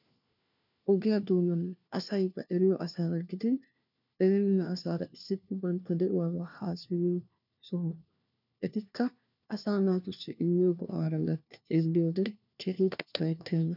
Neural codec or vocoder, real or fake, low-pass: codec, 16 kHz, 1 kbps, FunCodec, trained on LibriTTS, 50 frames a second; fake; 5.4 kHz